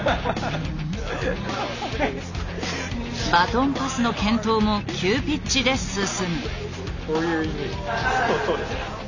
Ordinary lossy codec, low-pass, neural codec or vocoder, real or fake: none; 7.2 kHz; none; real